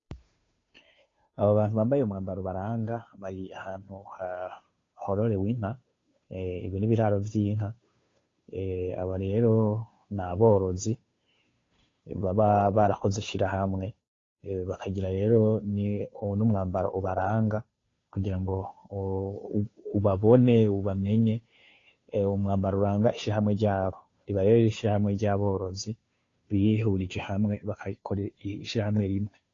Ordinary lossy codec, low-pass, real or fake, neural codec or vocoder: AAC, 32 kbps; 7.2 kHz; fake; codec, 16 kHz, 2 kbps, FunCodec, trained on Chinese and English, 25 frames a second